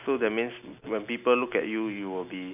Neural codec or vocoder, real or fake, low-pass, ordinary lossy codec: none; real; 3.6 kHz; none